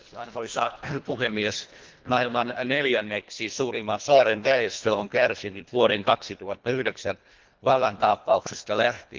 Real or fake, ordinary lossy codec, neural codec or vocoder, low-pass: fake; Opus, 32 kbps; codec, 24 kHz, 1.5 kbps, HILCodec; 7.2 kHz